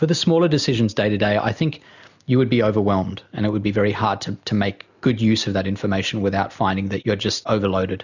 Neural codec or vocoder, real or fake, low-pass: none; real; 7.2 kHz